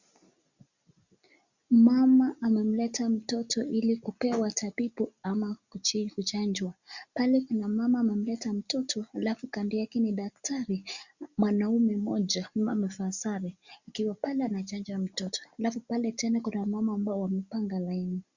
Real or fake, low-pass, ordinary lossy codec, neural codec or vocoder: real; 7.2 kHz; Opus, 64 kbps; none